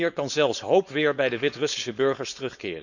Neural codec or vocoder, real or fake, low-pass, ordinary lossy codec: codec, 16 kHz, 4.8 kbps, FACodec; fake; 7.2 kHz; none